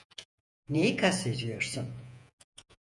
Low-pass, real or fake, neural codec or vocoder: 10.8 kHz; fake; vocoder, 48 kHz, 128 mel bands, Vocos